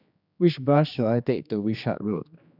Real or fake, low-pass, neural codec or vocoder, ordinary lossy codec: fake; 5.4 kHz; codec, 16 kHz, 2 kbps, X-Codec, HuBERT features, trained on general audio; none